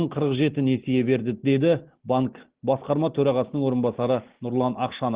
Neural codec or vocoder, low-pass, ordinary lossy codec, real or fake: none; 3.6 kHz; Opus, 16 kbps; real